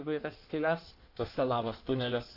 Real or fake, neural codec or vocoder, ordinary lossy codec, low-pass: fake; codec, 16 kHz in and 24 kHz out, 1.1 kbps, FireRedTTS-2 codec; AAC, 32 kbps; 5.4 kHz